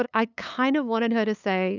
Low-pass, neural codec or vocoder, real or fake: 7.2 kHz; codec, 16 kHz, 8 kbps, FunCodec, trained on LibriTTS, 25 frames a second; fake